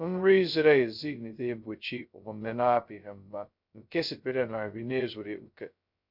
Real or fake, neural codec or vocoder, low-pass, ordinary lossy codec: fake; codec, 16 kHz, 0.2 kbps, FocalCodec; 5.4 kHz; none